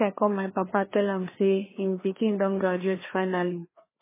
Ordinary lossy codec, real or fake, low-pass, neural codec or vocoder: MP3, 16 kbps; fake; 3.6 kHz; codec, 16 kHz, 2 kbps, FreqCodec, larger model